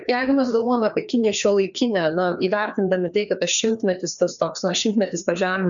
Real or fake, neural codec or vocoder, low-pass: fake; codec, 16 kHz, 2 kbps, FreqCodec, larger model; 7.2 kHz